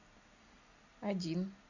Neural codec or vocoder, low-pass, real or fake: none; 7.2 kHz; real